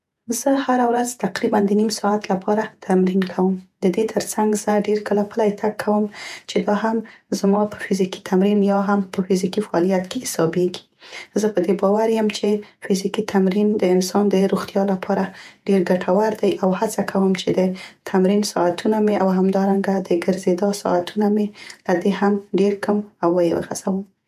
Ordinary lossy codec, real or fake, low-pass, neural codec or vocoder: none; fake; 14.4 kHz; vocoder, 48 kHz, 128 mel bands, Vocos